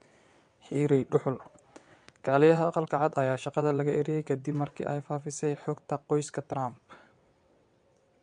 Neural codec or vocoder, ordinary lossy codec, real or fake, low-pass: vocoder, 22.05 kHz, 80 mel bands, WaveNeXt; MP3, 64 kbps; fake; 9.9 kHz